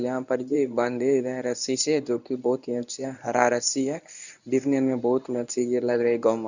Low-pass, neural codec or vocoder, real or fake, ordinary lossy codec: 7.2 kHz; codec, 24 kHz, 0.9 kbps, WavTokenizer, medium speech release version 1; fake; none